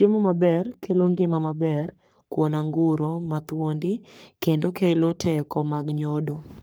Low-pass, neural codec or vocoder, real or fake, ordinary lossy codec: none; codec, 44.1 kHz, 3.4 kbps, Pupu-Codec; fake; none